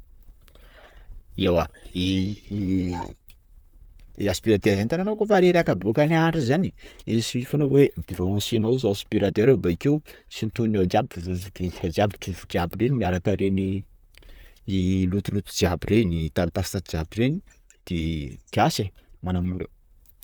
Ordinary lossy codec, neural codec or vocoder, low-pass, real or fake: none; vocoder, 44.1 kHz, 128 mel bands, Pupu-Vocoder; none; fake